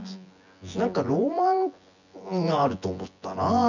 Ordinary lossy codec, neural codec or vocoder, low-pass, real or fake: none; vocoder, 24 kHz, 100 mel bands, Vocos; 7.2 kHz; fake